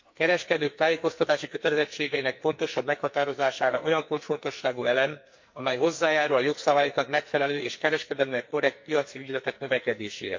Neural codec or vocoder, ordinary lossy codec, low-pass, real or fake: codec, 44.1 kHz, 2.6 kbps, SNAC; MP3, 48 kbps; 7.2 kHz; fake